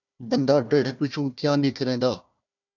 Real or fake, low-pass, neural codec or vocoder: fake; 7.2 kHz; codec, 16 kHz, 1 kbps, FunCodec, trained on Chinese and English, 50 frames a second